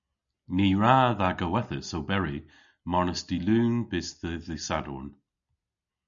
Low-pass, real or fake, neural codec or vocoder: 7.2 kHz; real; none